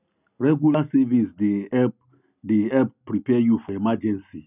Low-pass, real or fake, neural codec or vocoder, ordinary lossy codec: 3.6 kHz; real; none; none